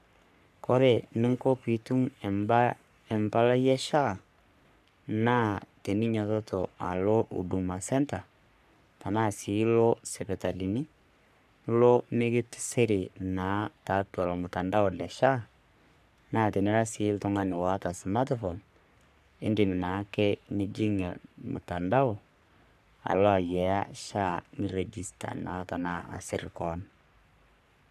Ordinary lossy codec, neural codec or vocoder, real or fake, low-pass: none; codec, 44.1 kHz, 3.4 kbps, Pupu-Codec; fake; 14.4 kHz